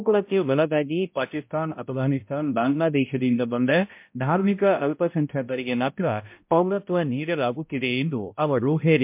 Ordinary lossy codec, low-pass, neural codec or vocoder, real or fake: MP3, 32 kbps; 3.6 kHz; codec, 16 kHz, 0.5 kbps, X-Codec, HuBERT features, trained on balanced general audio; fake